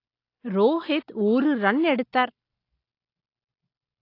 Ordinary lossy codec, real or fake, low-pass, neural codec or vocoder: AAC, 32 kbps; real; 5.4 kHz; none